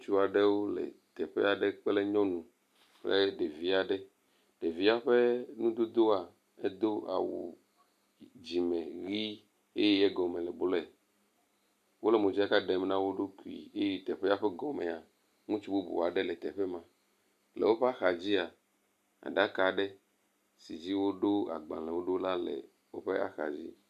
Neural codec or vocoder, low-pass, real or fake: none; 14.4 kHz; real